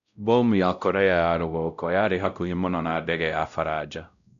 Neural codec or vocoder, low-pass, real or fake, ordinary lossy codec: codec, 16 kHz, 0.5 kbps, X-Codec, WavLM features, trained on Multilingual LibriSpeech; 7.2 kHz; fake; none